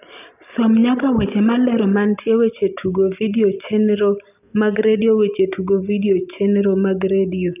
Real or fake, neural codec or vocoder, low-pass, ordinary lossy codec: real; none; 3.6 kHz; none